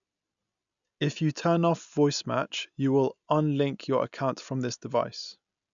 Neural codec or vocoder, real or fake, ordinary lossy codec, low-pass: none; real; none; 7.2 kHz